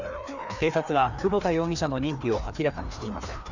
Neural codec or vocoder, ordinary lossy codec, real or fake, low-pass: codec, 16 kHz, 2 kbps, FreqCodec, larger model; none; fake; 7.2 kHz